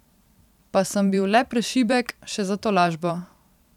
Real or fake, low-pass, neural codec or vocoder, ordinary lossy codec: fake; 19.8 kHz; vocoder, 44.1 kHz, 128 mel bands every 512 samples, BigVGAN v2; none